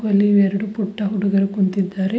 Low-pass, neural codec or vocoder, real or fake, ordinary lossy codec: none; none; real; none